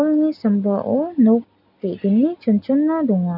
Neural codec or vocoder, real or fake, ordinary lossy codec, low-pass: none; real; none; 5.4 kHz